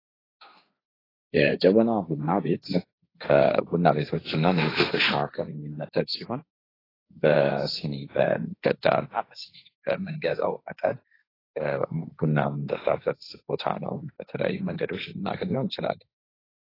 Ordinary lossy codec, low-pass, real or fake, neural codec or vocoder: AAC, 24 kbps; 5.4 kHz; fake; codec, 16 kHz, 1.1 kbps, Voila-Tokenizer